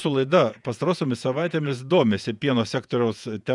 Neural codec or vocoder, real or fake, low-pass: vocoder, 48 kHz, 128 mel bands, Vocos; fake; 10.8 kHz